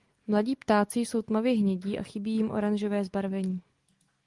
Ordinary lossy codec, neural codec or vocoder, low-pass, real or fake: Opus, 24 kbps; none; 10.8 kHz; real